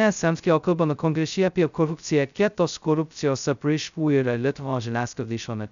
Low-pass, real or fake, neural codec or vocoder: 7.2 kHz; fake; codec, 16 kHz, 0.2 kbps, FocalCodec